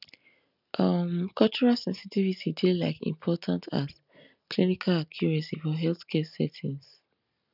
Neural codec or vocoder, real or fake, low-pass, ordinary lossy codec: none; real; 5.4 kHz; none